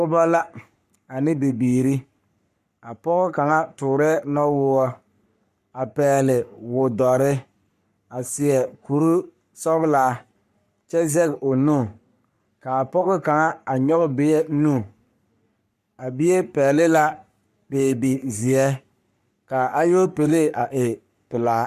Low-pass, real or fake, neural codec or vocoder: 14.4 kHz; fake; codec, 44.1 kHz, 3.4 kbps, Pupu-Codec